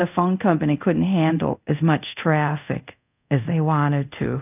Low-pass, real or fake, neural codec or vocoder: 3.6 kHz; fake; codec, 24 kHz, 0.5 kbps, DualCodec